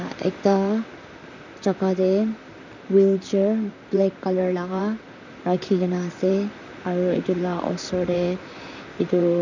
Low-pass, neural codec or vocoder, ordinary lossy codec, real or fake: 7.2 kHz; vocoder, 44.1 kHz, 128 mel bands, Pupu-Vocoder; none; fake